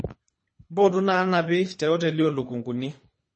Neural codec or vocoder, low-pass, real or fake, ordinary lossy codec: codec, 24 kHz, 3 kbps, HILCodec; 9.9 kHz; fake; MP3, 32 kbps